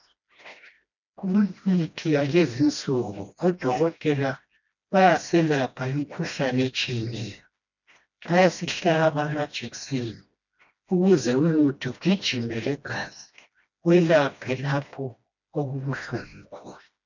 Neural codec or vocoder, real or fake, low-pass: codec, 16 kHz, 1 kbps, FreqCodec, smaller model; fake; 7.2 kHz